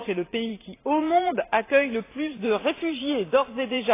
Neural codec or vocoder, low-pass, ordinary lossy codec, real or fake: vocoder, 44.1 kHz, 128 mel bands every 512 samples, BigVGAN v2; 3.6 kHz; AAC, 24 kbps; fake